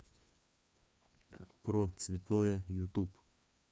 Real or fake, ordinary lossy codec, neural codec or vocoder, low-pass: fake; none; codec, 16 kHz, 2 kbps, FreqCodec, larger model; none